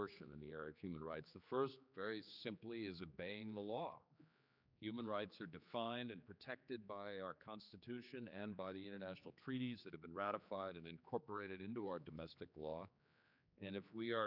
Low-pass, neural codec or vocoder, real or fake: 5.4 kHz; codec, 16 kHz, 4 kbps, X-Codec, HuBERT features, trained on general audio; fake